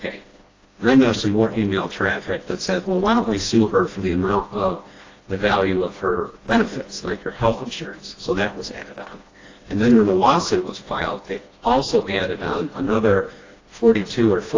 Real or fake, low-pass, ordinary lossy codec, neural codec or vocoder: fake; 7.2 kHz; AAC, 32 kbps; codec, 16 kHz, 1 kbps, FreqCodec, smaller model